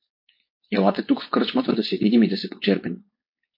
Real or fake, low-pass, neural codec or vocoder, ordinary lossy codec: fake; 5.4 kHz; codec, 16 kHz, 4.8 kbps, FACodec; MP3, 32 kbps